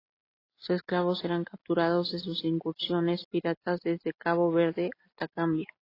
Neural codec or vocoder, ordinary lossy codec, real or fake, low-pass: none; AAC, 24 kbps; real; 5.4 kHz